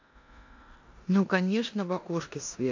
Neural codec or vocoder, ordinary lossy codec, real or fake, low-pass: codec, 16 kHz in and 24 kHz out, 0.9 kbps, LongCat-Audio-Codec, four codebook decoder; AAC, 48 kbps; fake; 7.2 kHz